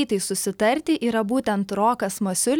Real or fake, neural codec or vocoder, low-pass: real; none; 19.8 kHz